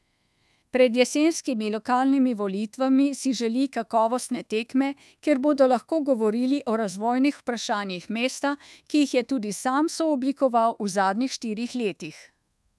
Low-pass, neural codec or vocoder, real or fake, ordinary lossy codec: none; codec, 24 kHz, 1.2 kbps, DualCodec; fake; none